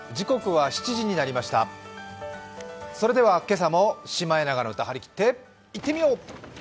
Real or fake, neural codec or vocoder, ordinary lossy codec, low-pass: real; none; none; none